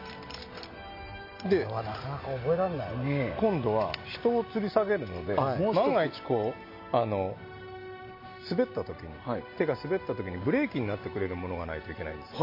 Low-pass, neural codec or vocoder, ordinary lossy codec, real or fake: 5.4 kHz; none; MP3, 32 kbps; real